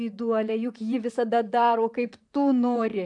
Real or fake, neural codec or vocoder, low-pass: fake; vocoder, 44.1 kHz, 128 mel bands, Pupu-Vocoder; 10.8 kHz